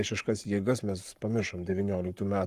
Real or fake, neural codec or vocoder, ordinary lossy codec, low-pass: fake; codec, 44.1 kHz, 7.8 kbps, Pupu-Codec; Opus, 32 kbps; 14.4 kHz